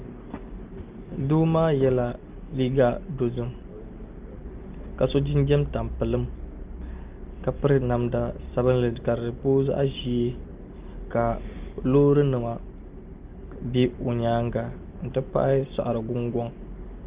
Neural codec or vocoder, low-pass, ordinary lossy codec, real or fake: none; 3.6 kHz; Opus, 24 kbps; real